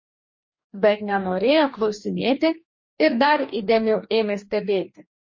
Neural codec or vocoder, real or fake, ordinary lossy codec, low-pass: codec, 44.1 kHz, 2.6 kbps, DAC; fake; MP3, 32 kbps; 7.2 kHz